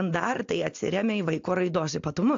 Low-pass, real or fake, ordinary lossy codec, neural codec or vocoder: 7.2 kHz; real; AAC, 48 kbps; none